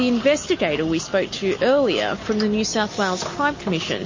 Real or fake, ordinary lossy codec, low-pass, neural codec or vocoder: real; MP3, 32 kbps; 7.2 kHz; none